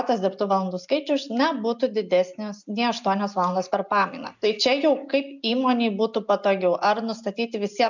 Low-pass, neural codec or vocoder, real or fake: 7.2 kHz; none; real